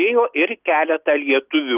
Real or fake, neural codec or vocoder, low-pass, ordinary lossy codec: real; none; 3.6 kHz; Opus, 24 kbps